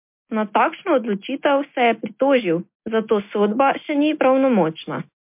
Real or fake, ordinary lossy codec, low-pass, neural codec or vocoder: real; MP3, 32 kbps; 3.6 kHz; none